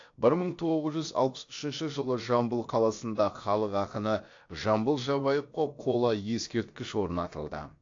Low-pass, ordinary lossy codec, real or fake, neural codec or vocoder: 7.2 kHz; AAC, 48 kbps; fake; codec, 16 kHz, about 1 kbps, DyCAST, with the encoder's durations